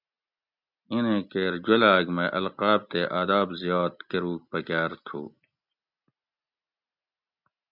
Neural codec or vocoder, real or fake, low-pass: none; real; 5.4 kHz